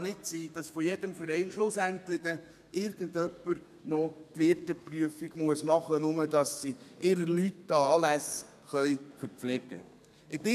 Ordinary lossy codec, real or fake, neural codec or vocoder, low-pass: none; fake; codec, 32 kHz, 1.9 kbps, SNAC; 14.4 kHz